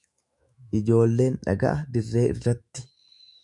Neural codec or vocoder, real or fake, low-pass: autoencoder, 48 kHz, 128 numbers a frame, DAC-VAE, trained on Japanese speech; fake; 10.8 kHz